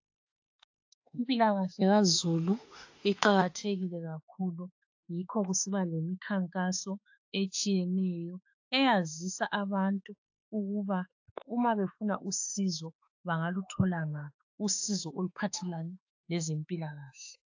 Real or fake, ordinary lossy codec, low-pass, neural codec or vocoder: fake; AAC, 48 kbps; 7.2 kHz; autoencoder, 48 kHz, 32 numbers a frame, DAC-VAE, trained on Japanese speech